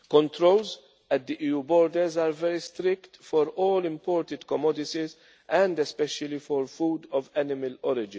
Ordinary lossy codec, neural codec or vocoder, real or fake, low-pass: none; none; real; none